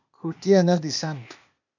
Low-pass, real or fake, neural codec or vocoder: 7.2 kHz; fake; codec, 16 kHz, 0.8 kbps, ZipCodec